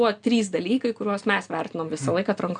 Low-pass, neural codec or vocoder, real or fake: 9.9 kHz; none; real